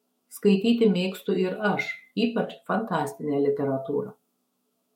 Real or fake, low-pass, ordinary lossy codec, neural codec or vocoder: fake; 19.8 kHz; MP3, 64 kbps; autoencoder, 48 kHz, 128 numbers a frame, DAC-VAE, trained on Japanese speech